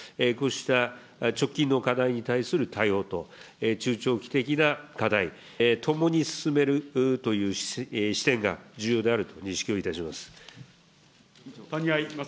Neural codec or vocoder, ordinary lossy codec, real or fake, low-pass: none; none; real; none